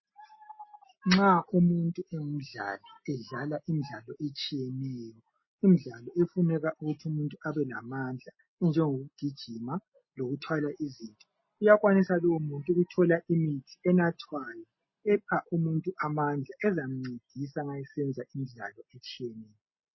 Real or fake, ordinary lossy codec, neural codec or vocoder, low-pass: real; MP3, 24 kbps; none; 7.2 kHz